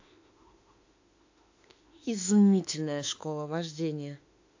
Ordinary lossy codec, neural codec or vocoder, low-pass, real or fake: AAC, 48 kbps; autoencoder, 48 kHz, 32 numbers a frame, DAC-VAE, trained on Japanese speech; 7.2 kHz; fake